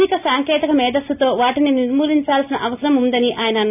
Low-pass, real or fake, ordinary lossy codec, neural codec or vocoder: 3.6 kHz; real; AAC, 32 kbps; none